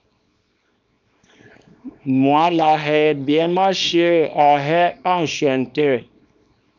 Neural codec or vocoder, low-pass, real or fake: codec, 24 kHz, 0.9 kbps, WavTokenizer, small release; 7.2 kHz; fake